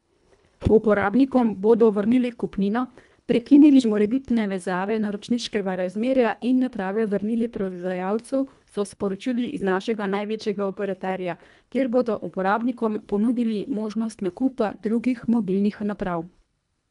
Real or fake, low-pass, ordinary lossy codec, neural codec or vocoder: fake; 10.8 kHz; MP3, 96 kbps; codec, 24 kHz, 1.5 kbps, HILCodec